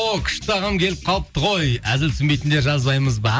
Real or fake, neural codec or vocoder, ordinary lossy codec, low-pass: real; none; none; none